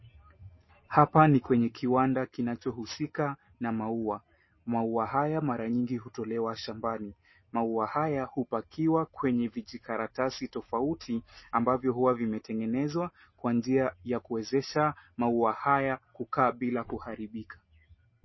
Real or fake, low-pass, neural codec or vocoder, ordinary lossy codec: real; 7.2 kHz; none; MP3, 24 kbps